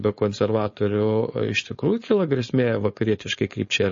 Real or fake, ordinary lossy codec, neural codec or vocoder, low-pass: fake; MP3, 32 kbps; codec, 16 kHz, 4.8 kbps, FACodec; 7.2 kHz